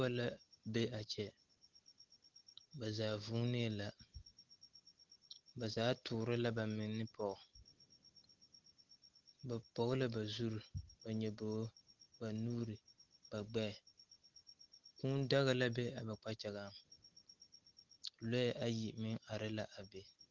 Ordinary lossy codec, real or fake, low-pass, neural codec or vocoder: Opus, 16 kbps; real; 7.2 kHz; none